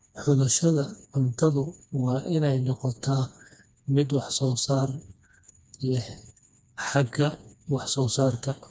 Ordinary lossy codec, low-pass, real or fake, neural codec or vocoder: none; none; fake; codec, 16 kHz, 2 kbps, FreqCodec, smaller model